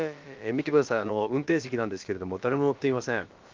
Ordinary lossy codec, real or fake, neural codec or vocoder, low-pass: Opus, 24 kbps; fake; codec, 16 kHz, about 1 kbps, DyCAST, with the encoder's durations; 7.2 kHz